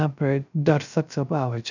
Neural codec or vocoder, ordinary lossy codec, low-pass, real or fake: codec, 16 kHz, 0.3 kbps, FocalCodec; none; 7.2 kHz; fake